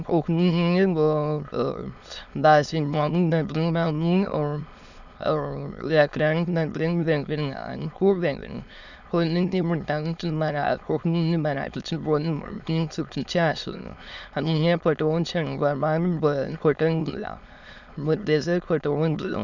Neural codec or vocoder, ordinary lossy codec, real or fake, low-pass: autoencoder, 22.05 kHz, a latent of 192 numbers a frame, VITS, trained on many speakers; none; fake; 7.2 kHz